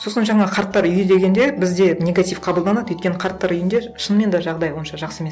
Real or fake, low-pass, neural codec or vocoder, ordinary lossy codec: real; none; none; none